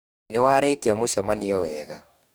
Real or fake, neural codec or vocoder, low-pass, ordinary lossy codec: fake; codec, 44.1 kHz, 2.6 kbps, DAC; none; none